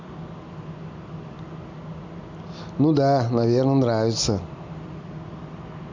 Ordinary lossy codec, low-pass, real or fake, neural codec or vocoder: MP3, 64 kbps; 7.2 kHz; real; none